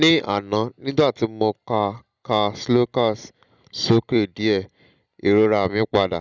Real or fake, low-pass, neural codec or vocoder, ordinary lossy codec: real; 7.2 kHz; none; Opus, 64 kbps